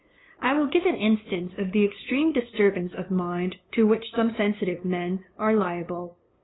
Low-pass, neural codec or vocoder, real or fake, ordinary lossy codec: 7.2 kHz; codec, 16 kHz, 2 kbps, FunCodec, trained on LibriTTS, 25 frames a second; fake; AAC, 16 kbps